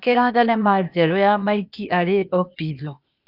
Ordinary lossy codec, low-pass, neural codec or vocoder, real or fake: none; 5.4 kHz; codec, 16 kHz, 0.8 kbps, ZipCodec; fake